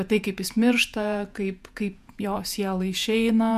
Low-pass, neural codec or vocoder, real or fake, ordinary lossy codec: 14.4 kHz; vocoder, 48 kHz, 128 mel bands, Vocos; fake; MP3, 96 kbps